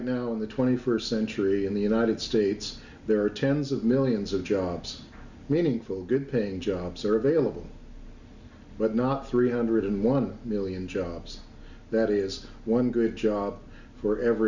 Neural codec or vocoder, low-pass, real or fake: none; 7.2 kHz; real